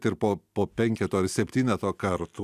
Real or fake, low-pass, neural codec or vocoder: real; 14.4 kHz; none